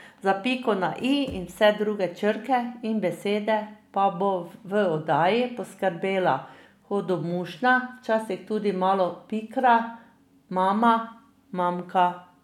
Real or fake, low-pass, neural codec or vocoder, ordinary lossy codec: real; 19.8 kHz; none; none